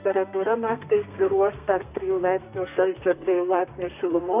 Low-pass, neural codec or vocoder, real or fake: 3.6 kHz; codec, 32 kHz, 1.9 kbps, SNAC; fake